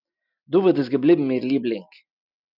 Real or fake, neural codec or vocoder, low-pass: real; none; 5.4 kHz